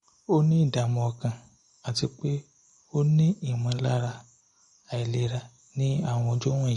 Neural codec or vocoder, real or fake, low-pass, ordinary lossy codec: none; real; 14.4 kHz; MP3, 48 kbps